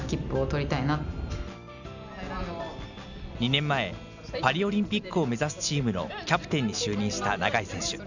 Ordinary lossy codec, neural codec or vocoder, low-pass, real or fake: none; none; 7.2 kHz; real